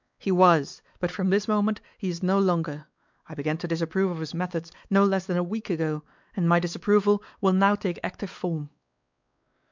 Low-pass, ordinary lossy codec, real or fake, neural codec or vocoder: 7.2 kHz; MP3, 64 kbps; fake; codec, 16 kHz, 4 kbps, X-Codec, WavLM features, trained on Multilingual LibriSpeech